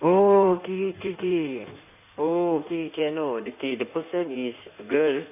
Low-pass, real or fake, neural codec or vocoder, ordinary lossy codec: 3.6 kHz; fake; codec, 16 kHz in and 24 kHz out, 1.1 kbps, FireRedTTS-2 codec; none